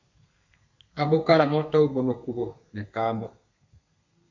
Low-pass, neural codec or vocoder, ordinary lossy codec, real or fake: 7.2 kHz; codec, 32 kHz, 1.9 kbps, SNAC; MP3, 48 kbps; fake